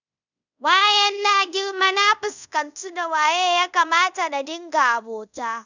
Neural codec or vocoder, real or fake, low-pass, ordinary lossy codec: codec, 24 kHz, 0.5 kbps, DualCodec; fake; 7.2 kHz; none